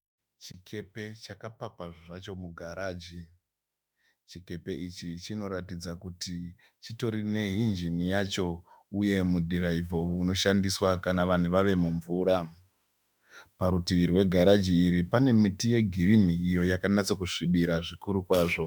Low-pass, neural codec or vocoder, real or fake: 19.8 kHz; autoencoder, 48 kHz, 32 numbers a frame, DAC-VAE, trained on Japanese speech; fake